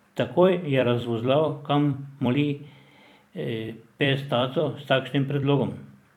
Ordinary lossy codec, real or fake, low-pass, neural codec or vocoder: none; fake; 19.8 kHz; vocoder, 44.1 kHz, 128 mel bands every 256 samples, BigVGAN v2